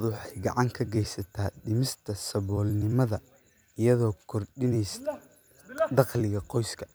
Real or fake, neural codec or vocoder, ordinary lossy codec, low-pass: fake; vocoder, 44.1 kHz, 128 mel bands every 256 samples, BigVGAN v2; none; none